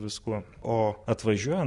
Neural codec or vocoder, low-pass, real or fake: none; 10.8 kHz; real